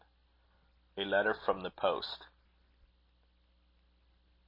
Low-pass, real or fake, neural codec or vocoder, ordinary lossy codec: 5.4 kHz; real; none; MP3, 24 kbps